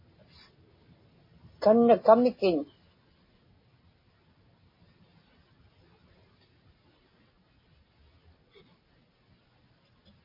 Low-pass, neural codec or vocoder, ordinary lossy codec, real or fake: 5.4 kHz; none; MP3, 24 kbps; real